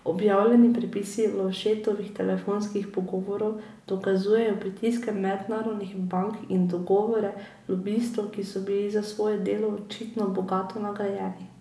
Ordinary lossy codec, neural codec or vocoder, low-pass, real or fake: none; none; none; real